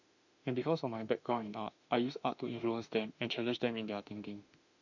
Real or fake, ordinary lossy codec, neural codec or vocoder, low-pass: fake; none; autoencoder, 48 kHz, 32 numbers a frame, DAC-VAE, trained on Japanese speech; 7.2 kHz